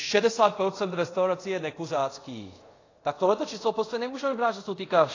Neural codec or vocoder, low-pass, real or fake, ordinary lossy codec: codec, 24 kHz, 0.5 kbps, DualCodec; 7.2 kHz; fake; AAC, 32 kbps